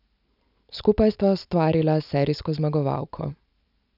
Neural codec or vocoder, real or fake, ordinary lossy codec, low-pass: none; real; none; 5.4 kHz